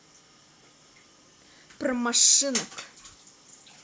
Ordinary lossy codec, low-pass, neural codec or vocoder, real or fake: none; none; none; real